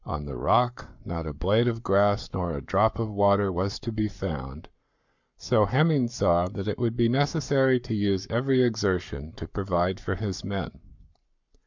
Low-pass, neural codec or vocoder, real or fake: 7.2 kHz; codec, 44.1 kHz, 7.8 kbps, Pupu-Codec; fake